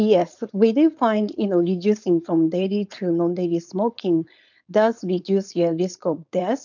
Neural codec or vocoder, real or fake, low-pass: codec, 16 kHz, 4.8 kbps, FACodec; fake; 7.2 kHz